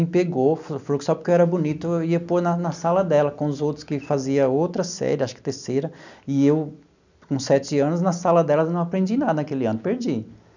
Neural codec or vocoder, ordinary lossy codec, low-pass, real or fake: none; none; 7.2 kHz; real